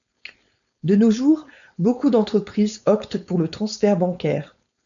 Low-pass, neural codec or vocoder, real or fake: 7.2 kHz; codec, 16 kHz, 4.8 kbps, FACodec; fake